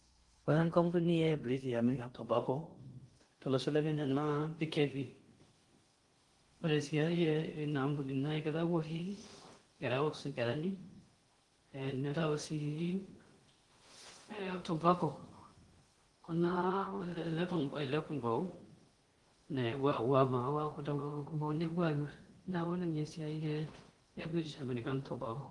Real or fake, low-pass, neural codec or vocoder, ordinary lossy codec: fake; 10.8 kHz; codec, 16 kHz in and 24 kHz out, 0.6 kbps, FocalCodec, streaming, 2048 codes; Opus, 24 kbps